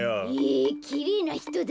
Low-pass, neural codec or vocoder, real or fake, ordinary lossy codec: none; none; real; none